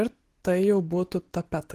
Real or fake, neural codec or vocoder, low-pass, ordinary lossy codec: real; none; 14.4 kHz; Opus, 16 kbps